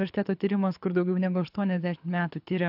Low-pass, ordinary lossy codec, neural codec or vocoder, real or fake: 5.4 kHz; AAC, 48 kbps; codec, 24 kHz, 6 kbps, HILCodec; fake